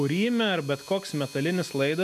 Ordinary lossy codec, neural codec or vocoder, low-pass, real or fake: MP3, 96 kbps; none; 14.4 kHz; real